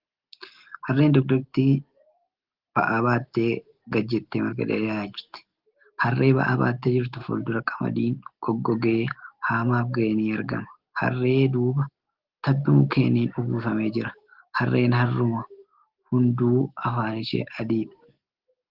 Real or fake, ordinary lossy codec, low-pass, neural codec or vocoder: real; Opus, 16 kbps; 5.4 kHz; none